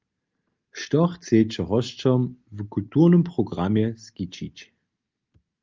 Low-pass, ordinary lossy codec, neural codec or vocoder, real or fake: 7.2 kHz; Opus, 24 kbps; none; real